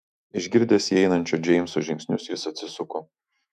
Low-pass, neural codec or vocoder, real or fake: 14.4 kHz; autoencoder, 48 kHz, 128 numbers a frame, DAC-VAE, trained on Japanese speech; fake